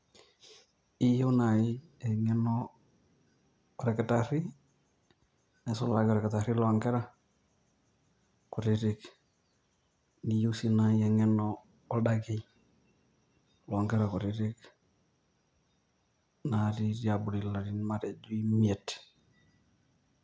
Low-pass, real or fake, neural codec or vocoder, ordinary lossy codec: none; real; none; none